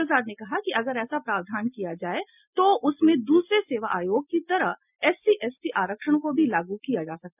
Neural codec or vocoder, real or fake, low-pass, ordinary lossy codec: none; real; 3.6 kHz; none